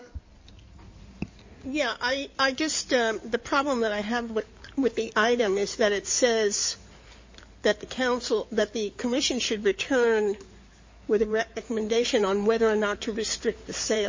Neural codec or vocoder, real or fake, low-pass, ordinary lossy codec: codec, 16 kHz in and 24 kHz out, 2.2 kbps, FireRedTTS-2 codec; fake; 7.2 kHz; MP3, 32 kbps